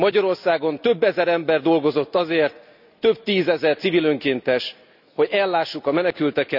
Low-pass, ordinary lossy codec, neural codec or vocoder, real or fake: 5.4 kHz; none; none; real